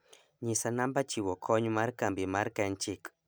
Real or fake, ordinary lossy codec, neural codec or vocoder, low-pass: real; none; none; none